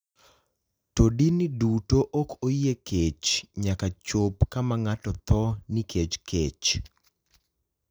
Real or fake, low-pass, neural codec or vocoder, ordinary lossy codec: real; none; none; none